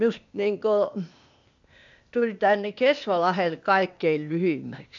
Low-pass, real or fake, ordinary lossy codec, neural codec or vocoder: 7.2 kHz; fake; AAC, 64 kbps; codec, 16 kHz, 0.8 kbps, ZipCodec